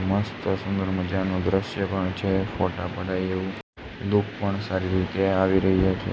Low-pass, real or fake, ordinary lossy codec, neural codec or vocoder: none; real; none; none